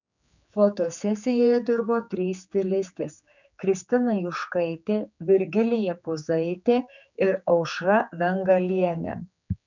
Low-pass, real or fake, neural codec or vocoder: 7.2 kHz; fake; codec, 16 kHz, 4 kbps, X-Codec, HuBERT features, trained on general audio